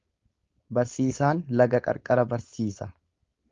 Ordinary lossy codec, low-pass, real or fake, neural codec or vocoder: Opus, 32 kbps; 7.2 kHz; fake; codec, 16 kHz, 4.8 kbps, FACodec